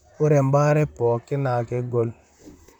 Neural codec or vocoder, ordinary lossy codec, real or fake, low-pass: vocoder, 44.1 kHz, 128 mel bands, Pupu-Vocoder; none; fake; 19.8 kHz